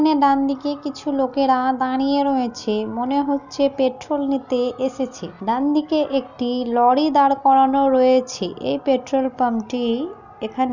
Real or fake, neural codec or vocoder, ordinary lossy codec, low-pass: real; none; none; 7.2 kHz